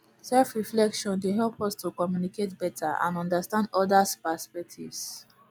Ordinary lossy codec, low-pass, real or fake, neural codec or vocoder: none; none; real; none